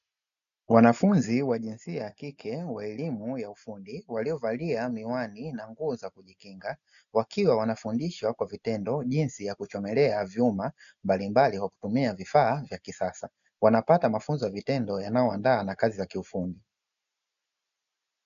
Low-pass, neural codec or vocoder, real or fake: 7.2 kHz; none; real